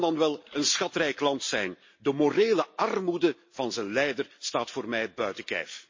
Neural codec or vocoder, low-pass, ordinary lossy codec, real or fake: none; 7.2 kHz; MP3, 32 kbps; real